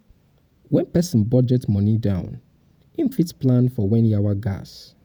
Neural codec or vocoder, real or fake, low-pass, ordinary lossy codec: vocoder, 48 kHz, 128 mel bands, Vocos; fake; none; none